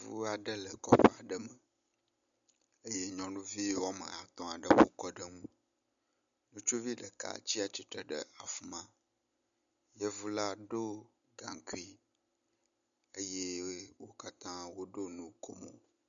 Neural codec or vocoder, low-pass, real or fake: none; 7.2 kHz; real